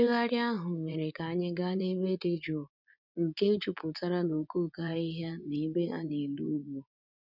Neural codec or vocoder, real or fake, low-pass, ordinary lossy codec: vocoder, 24 kHz, 100 mel bands, Vocos; fake; 5.4 kHz; none